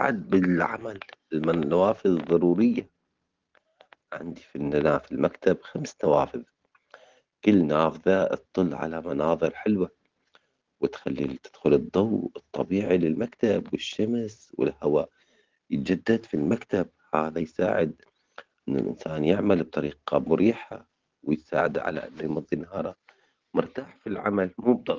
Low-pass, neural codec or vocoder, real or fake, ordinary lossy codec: 7.2 kHz; none; real; Opus, 16 kbps